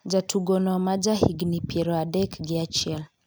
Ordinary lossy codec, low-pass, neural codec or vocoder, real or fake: none; none; none; real